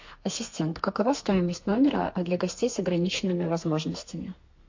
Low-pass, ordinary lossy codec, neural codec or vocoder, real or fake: 7.2 kHz; MP3, 48 kbps; codec, 32 kHz, 1.9 kbps, SNAC; fake